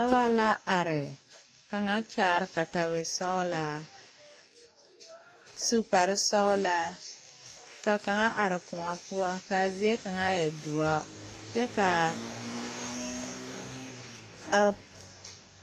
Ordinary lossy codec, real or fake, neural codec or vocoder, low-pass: AAC, 64 kbps; fake; codec, 44.1 kHz, 2.6 kbps, DAC; 14.4 kHz